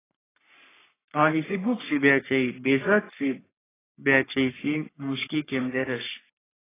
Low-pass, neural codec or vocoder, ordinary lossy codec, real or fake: 3.6 kHz; codec, 44.1 kHz, 3.4 kbps, Pupu-Codec; AAC, 16 kbps; fake